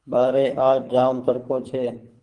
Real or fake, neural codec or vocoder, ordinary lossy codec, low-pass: fake; codec, 24 kHz, 3 kbps, HILCodec; Opus, 24 kbps; 10.8 kHz